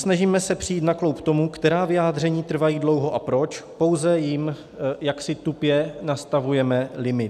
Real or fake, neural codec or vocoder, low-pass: real; none; 14.4 kHz